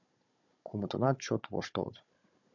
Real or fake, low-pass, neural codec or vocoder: fake; 7.2 kHz; codec, 16 kHz, 16 kbps, FunCodec, trained on Chinese and English, 50 frames a second